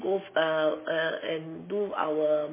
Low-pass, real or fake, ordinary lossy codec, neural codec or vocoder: 3.6 kHz; real; MP3, 16 kbps; none